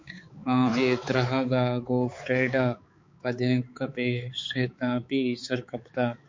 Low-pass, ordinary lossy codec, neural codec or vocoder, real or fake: 7.2 kHz; MP3, 48 kbps; codec, 16 kHz, 4 kbps, X-Codec, HuBERT features, trained on balanced general audio; fake